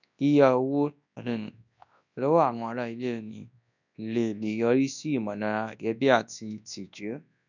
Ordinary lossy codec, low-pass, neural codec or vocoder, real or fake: none; 7.2 kHz; codec, 24 kHz, 0.9 kbps, WavTokenizer, large speech release; fake